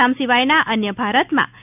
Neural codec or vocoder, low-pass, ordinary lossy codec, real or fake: none; 3.6 kHz; none; real